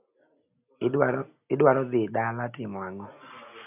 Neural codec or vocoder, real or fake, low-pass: none; real; 3.6 kHz